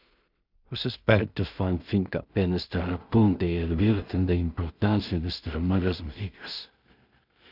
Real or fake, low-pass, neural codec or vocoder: fake; 5.4 kHz; codec, 16 kHz in and 24 kHz out, 0.4 kbps, LongCat-Audio-Codec, two codebook decoder